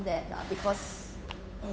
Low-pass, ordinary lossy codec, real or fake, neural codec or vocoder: none; none; fake; codec, 16 kHz, 8 kbps, FunCodec, trained on Chinese and English, 25 frames a second